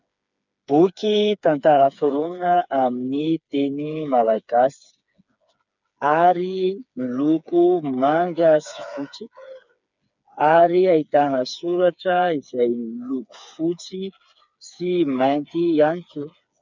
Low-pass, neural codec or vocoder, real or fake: 7.2 kHz; codec, 16 kHz, 4 kbps, FreqCodec, smaller model; fake